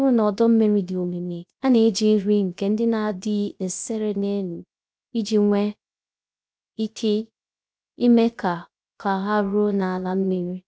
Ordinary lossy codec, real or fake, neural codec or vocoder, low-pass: none; fake; codec, 16 kHz, 0.3 kbps, FocalCodec; none